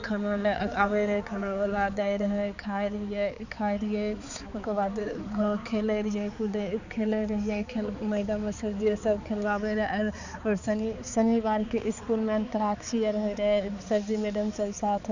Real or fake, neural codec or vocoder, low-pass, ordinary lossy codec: fake; codec, 16 kHz, 4 kbps, X-Codec, HuBERT features, trained on balanced general audio; 7.2 kHz; none